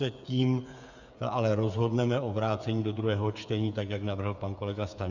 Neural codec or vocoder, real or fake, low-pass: codec, 16 kHz, 8 kbps, FreqCodec, smaller model; fake; 7.2 kHz